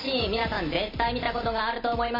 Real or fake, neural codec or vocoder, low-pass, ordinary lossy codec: real; none; 5.4 kHz; MP3, 24 kbps